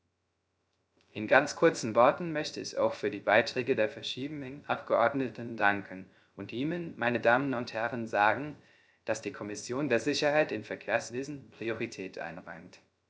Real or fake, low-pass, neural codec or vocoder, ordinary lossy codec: fake; none; codec, 16 kHz, 0.3 kbps, FocalCodec; none